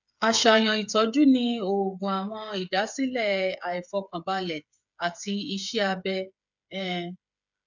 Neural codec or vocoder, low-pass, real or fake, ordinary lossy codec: codec, 16 kHz, 8 kbps, FreqCodec, smaller model; 7.2 kHz; fake; none